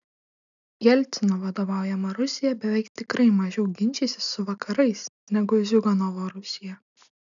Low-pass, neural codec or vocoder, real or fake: 7.2 kHz; none; real